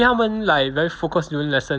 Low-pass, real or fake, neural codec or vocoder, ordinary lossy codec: none; real; none; none